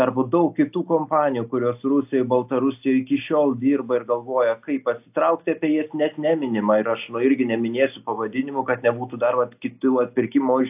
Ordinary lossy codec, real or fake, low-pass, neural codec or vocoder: AAC, 32 kbps; real; 3.6 kHz; none